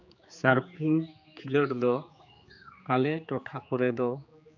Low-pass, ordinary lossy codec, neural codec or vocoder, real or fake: 7.2 kHz; none; codec, 16 kHz, 4 kbps, X-Codec, HuBERT features, trained on general audio; fake